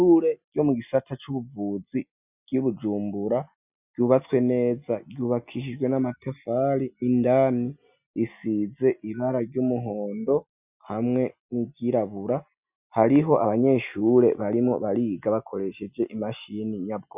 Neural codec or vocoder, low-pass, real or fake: none; 3.6 kHz; real